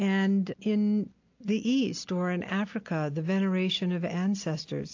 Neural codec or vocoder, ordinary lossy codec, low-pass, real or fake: none; AAC, 48 kbps; 7.2 kHz; real